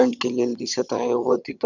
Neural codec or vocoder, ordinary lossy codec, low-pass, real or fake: vocoder, 22.05 kHz, 80 mel bands, HiFi-GAN; none; 7.2 kHz; fake